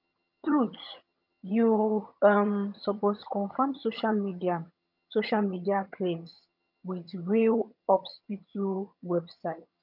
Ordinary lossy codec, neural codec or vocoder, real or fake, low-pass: none; vocoder, 22.05 kHz, 80 mel bands, HiFi-GAN; fake; 5.4 kHz